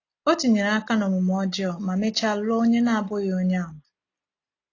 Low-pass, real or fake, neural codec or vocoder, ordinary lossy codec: 7.2 kHz; real; none; AAC, 32 kbps